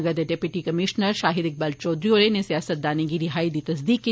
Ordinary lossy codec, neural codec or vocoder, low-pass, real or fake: none; none; none; real